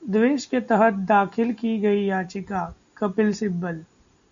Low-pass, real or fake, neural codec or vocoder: 7.2 kHz; real; none